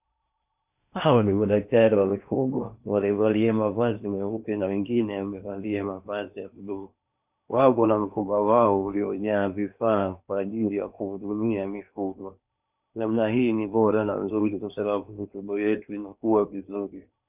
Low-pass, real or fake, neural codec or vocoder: 3.6 kHz; fake; codec, 16 kHz in and 24 kHz out, 0.8 kbps, FocalCodec, streaming, 65536 codes